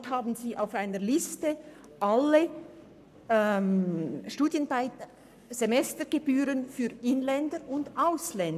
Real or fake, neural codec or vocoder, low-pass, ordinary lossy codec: fake; codec, 44.1 kHz, 7.8 kbps, Pupu-Codec; 14.4 kHz; none